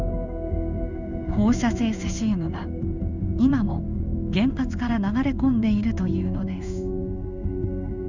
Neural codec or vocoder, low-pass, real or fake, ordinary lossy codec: codec, 16 kHz in and 24 kHz out, 1 kbps, XY-Tokenizer; 7.2 kHz; fake; none